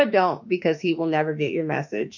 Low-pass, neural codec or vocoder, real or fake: 7.2 kHz; autoencoder, 48 kHz, 32 numbers a frame, DAC-VAE, trained on Japanese speech; fake